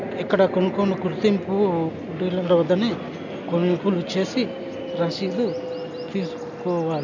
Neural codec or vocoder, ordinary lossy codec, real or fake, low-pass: none; none; real; 7.2 kHz